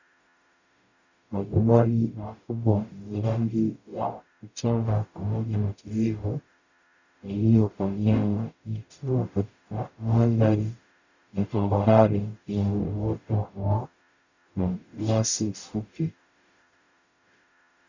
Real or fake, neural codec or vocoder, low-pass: fake; codec, 44.1 kHz, 0.9 kbps, DAC; 7.2 kHz